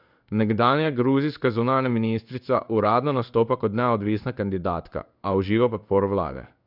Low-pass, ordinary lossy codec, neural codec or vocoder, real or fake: 5.4 kHz; none; codec, 16 kHz in and 24 kHz out, 1 kbps, XY-Tokenizer; fake